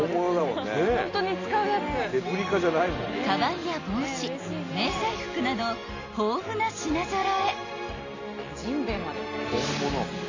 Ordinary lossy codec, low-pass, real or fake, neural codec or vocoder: AAC, 32 kbps; 7.2 kHz; real; none